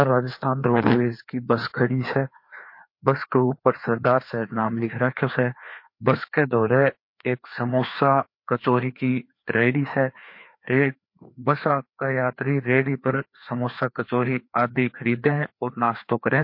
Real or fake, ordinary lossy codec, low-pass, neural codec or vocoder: fake; MP3, 32 kbps; 5.4 kHz; codec, 16 kHz, 2 kbps, FreqCodec, larger model